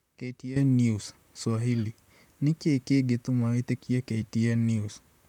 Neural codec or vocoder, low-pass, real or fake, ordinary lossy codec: vocoder, 44.1 kHz, 128 mel bands, Pupu-Vocoder; 19.8 kHz; fake; none